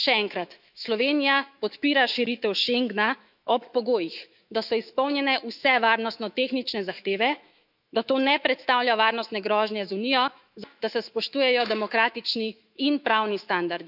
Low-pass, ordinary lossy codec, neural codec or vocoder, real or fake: 5.4 kHz; none; autoencoder, 48 kHz, 128 numbers a frame, DAC-VAE, trained on Japanese speech; fake